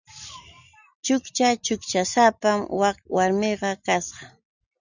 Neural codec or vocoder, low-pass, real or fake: none; 7.2 kHz; real